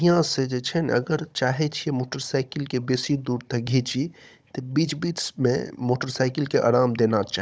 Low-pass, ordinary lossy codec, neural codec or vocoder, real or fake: none; none; codec, 16 kHz, 16 kbps, FunCodec, trained on Chinese and English, 50 frames a second; fake